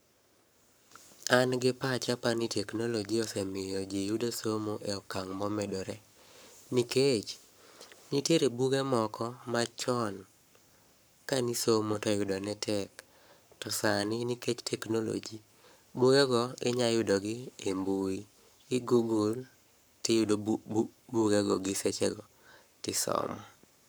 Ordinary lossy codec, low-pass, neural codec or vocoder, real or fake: none; none; codec, 44.1 kHz, 7.8 kbps, Pupu-Codec; fake